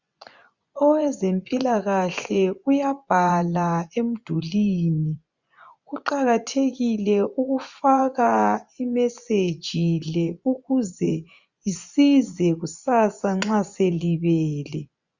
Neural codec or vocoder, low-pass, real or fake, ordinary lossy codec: vocoder, 24 kHz, 100 mel bands, Vocos; 7.2 kHz; fake; Opus, 64 kbps